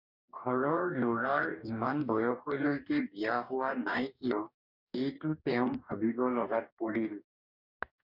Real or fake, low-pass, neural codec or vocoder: fake; 5.4 kHz; codec, 44.1 kHz, 2.6 kbps, DAC